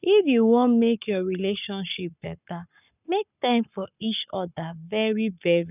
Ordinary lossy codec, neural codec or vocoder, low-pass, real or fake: none; codec, 44.1 kHz, 7.8 kbps, Pupu-Codec; 3.6 kHz; fake